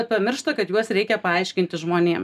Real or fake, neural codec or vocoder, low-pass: real; none; 14.4 kHz